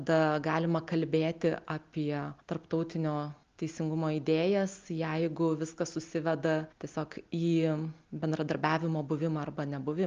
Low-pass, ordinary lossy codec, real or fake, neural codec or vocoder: 7.2 kHz; Opus, 32 kbps; real; none